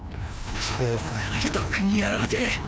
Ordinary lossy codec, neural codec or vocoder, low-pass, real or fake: none; codec, 16 kHz, 1 kbps, FreqCodec, larger model; none; fake